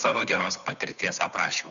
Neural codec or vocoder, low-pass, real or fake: codec, 16 kHz, 2 kbps, FunCodec, trained on Chinese and English, 25 frames a second; 7.2 kHz; fake